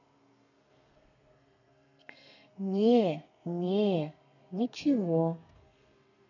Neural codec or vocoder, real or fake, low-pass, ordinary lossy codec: codec, 32 kHz, 1.9 kbps, SNAC; fake; 7.2 kHz; none